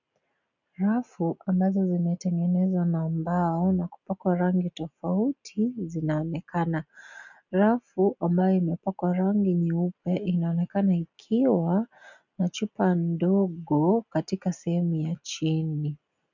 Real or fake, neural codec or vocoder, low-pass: real; none; 7.2 kHz